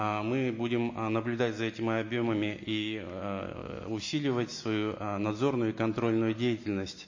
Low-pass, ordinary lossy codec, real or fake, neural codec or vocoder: 7.2 kHz; MP3, 32 kbps; real; none